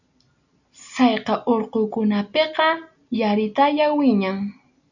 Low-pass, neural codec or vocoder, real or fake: 7.2 kHz; none; real